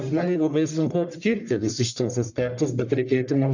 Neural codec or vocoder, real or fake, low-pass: codec, 44.1 kHz, 1.7 kbps, Pupu-Codec; fake; 7.2 kHz